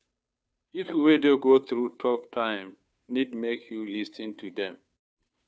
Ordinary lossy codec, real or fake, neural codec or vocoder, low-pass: none; fake; codec, 16 kHz, 2 kbps, FunCodec, trained on Chinese and English, 25 frames a second; none